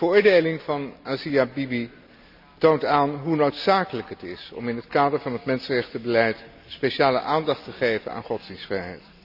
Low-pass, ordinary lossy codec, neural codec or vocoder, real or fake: 5.4 kHz; none; none; real